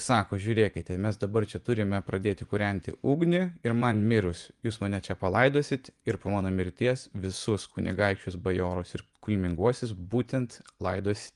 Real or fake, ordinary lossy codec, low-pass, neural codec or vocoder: fake; Opus, 32 kbps; 10.8 kHz; vocoder, 24 kHz, 100 mel bands, Vocos